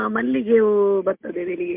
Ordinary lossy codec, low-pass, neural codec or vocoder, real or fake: MP3, 32 kbps; 3.6 kHz; none; real